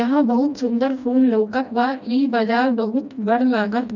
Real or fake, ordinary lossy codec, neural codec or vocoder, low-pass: fake; none; codec, 16 kHz, 1 kbps, FreqCodec, smaller model; 7.2 kHz